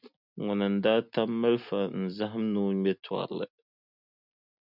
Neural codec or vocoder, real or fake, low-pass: none; real; 5.4 kHz